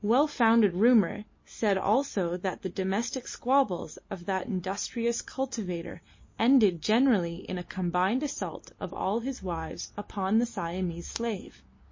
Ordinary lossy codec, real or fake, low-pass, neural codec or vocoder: MP3, 32 kbps; real; 7.2 kHz; none